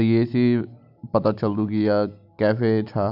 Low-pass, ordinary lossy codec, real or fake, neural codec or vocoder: 5.4 kHz; none; real; none